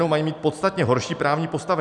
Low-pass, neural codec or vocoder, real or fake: 10.8 kHz; none; real